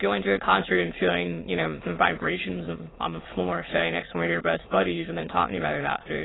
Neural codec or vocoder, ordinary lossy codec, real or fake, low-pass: autoencoder, 22.05 kHz, a latent of 192 numbers a frame, VITS, trained on many speakers; AAC, 16 kbps; fake; 7.2 kHz